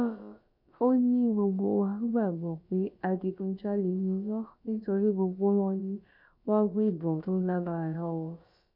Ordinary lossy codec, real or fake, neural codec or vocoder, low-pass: MP3, 48 kbps; fake; codec, 16 kHz, about 1 kbps, DyCAST, with the encoder's durations; 5.4 kHz